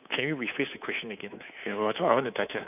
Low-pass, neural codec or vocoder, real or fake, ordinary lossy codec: 3.6 kHz; codec, 24 kHz, 3.1 kbps, DualCodec; fake; none